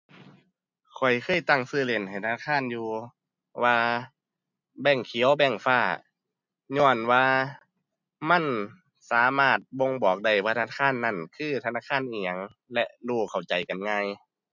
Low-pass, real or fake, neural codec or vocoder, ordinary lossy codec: 7.2 kHz; real; none; MP3, 64 kbps